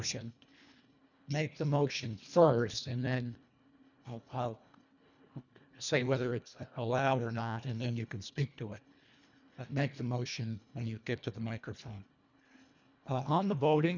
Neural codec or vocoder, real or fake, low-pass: codec, 24 kHz, 1.5 kbps, HILCodec; fake; 7.2 kHz